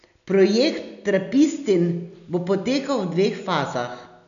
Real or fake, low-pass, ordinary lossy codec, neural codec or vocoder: real; 7.2 kHz; none; none